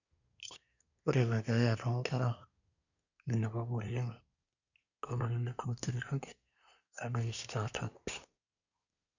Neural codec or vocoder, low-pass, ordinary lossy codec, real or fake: codec, 24 kHz, 1 kbps, SNAC; 7.2 kHz; none; fake